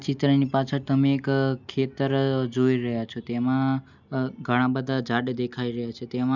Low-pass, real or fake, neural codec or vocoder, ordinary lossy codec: 7.2 kHz; real; none; none